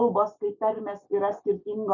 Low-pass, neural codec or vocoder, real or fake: 7.2 kHz; none; real